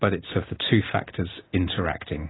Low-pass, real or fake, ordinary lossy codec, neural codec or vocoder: 7.2 kHz; real; AAC, 16 kbps; none